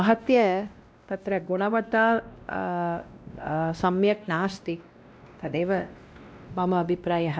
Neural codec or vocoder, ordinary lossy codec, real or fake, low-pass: codec, 16 kHz, 1 kbps, X-Codec, WavLM features, trained on Multilingual LibriSpeech; none; fake; none